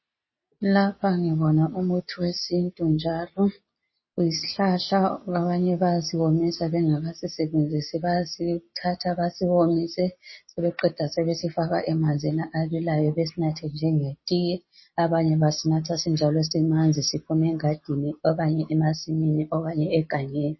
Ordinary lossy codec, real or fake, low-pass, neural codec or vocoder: MP3, 24 kbps; real; 7.2 kHz; none